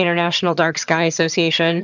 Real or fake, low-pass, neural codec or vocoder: fake; 7.2 kHz; vocoder, 22.05 kHz, 80 mel bands, HiFi-GAN